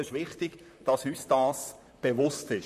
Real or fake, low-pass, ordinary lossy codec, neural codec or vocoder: fake; 14.4 kHz; MP3, 64 kbps; vocoder, 44.1 kHz, 128 mel bands, Pupu-Vocoder